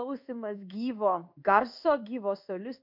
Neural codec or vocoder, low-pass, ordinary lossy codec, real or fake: codec, 16 kHz in and 24 kHz out, 1 kbps, XY-Tokenizer; 5.4 kHz; MP3, 48 kbps; fake